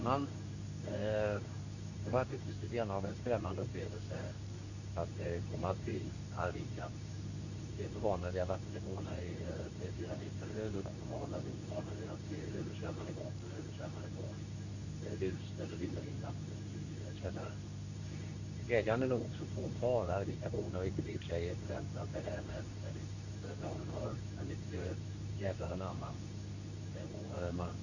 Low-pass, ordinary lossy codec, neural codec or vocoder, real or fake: 7.2 kHz; none; codec, 24 kHz, 0.9 kbps, WavTokenizer, medium speech release version 2; fake